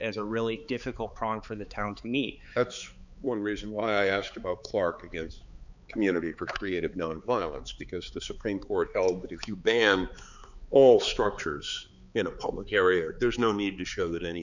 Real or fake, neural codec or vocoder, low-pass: fake; codec, 16 kHz, 4 kbps, X-Codec, HuBERT features, trained on balanced general audio; 7.2 kHz